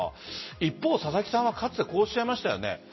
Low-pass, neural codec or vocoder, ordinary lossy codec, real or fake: 7.2 kHz; none; MP3, 24 kbps; real